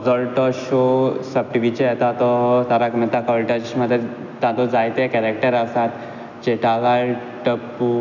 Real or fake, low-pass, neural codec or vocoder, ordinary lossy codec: real; 7.2 kHz; none; none